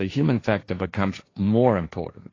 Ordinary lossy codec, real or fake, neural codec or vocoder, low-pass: AAC, 32 kbps; fake; codec, 16 kHz, 1.1 kbps, Voila-Tokenizer; 7.2 kHz